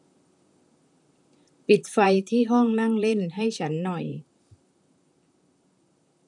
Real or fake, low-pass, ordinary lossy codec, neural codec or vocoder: fake; 10.8 kHz; none; vocoder, 44.1 kHz, 128 mel bands, Pupu-Vocoder